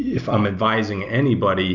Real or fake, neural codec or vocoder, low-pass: real; none; 7.2 kHz